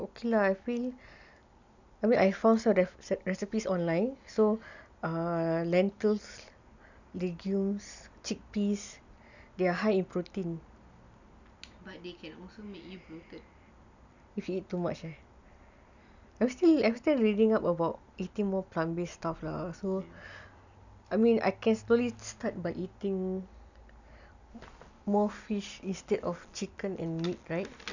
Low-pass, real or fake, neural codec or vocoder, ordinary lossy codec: 7.2 kHz; real; none; none